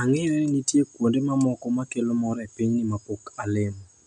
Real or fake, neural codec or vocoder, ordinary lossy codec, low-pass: real; none; none; 9.9 kHz